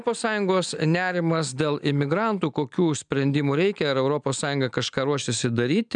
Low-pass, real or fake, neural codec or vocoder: 9.9 kHz; real; none